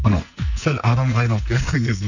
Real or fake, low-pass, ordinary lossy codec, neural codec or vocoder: fake; 7.2 kHz; none; codec, 44.1 kHz, 2.6 kbps, SNAC